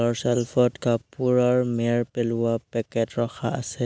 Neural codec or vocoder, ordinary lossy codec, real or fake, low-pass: none; none; real; none